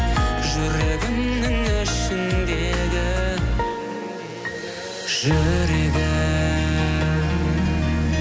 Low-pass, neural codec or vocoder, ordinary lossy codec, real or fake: none; none; none; real